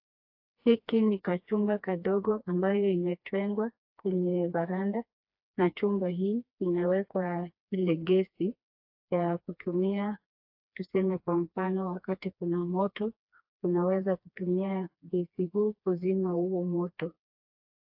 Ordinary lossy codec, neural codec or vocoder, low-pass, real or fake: AAC, 48 kbps; codec, 16 kHz, 2 kbps, FreqCodec, smaller model; 5.4 kHz; fake